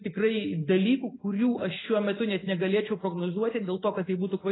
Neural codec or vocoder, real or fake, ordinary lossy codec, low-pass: none; real; AAC, 16 kbps; 7.2 kHz